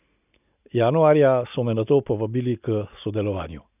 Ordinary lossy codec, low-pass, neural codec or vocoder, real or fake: none; 3.6 kHz; none; real